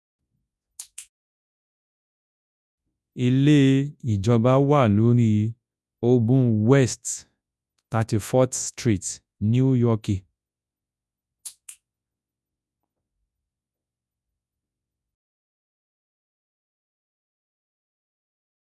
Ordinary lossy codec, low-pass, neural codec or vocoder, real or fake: none; none; codec, 24 kHz, 0.9 kbps, WavTokenizer, large speech release; fake